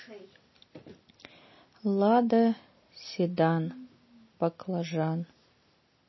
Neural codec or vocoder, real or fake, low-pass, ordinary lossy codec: none; real; 7.2 kHz; MP3, 24 kbps